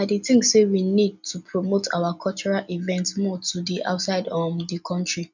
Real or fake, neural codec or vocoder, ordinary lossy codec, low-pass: real; none; none; 7.2 kHz